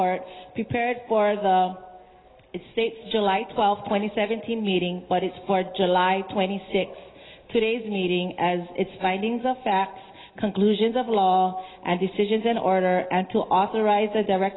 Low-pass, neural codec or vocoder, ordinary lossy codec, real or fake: 7.2 kHz; none; AAC, 16 kbps; real